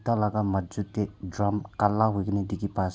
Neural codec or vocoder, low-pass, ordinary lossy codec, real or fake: none; none; none; real